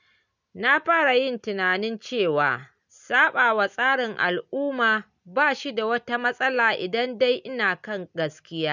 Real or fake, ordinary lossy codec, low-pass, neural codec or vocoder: real; none; 7.2 kHz; none